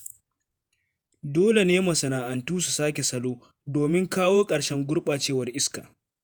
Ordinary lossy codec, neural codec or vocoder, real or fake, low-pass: none; vocoder, 48 kHz, 128 mel bands, Vocos; fake; none